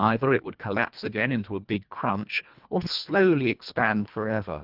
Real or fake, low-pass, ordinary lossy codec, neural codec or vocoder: fake; 5.4 kHz; Opus, 24 kbps; codec, 24 kHz, 1.5 kbps, HILCodec